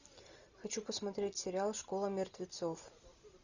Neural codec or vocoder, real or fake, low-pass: none; real; 7.2 kHz